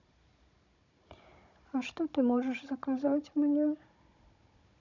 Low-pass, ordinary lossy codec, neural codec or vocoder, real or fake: 7.2 kHz; none; codec, 16 kHz, 16 kbps, FunCodec, trained on Chinese and English, 50 frames a second; fake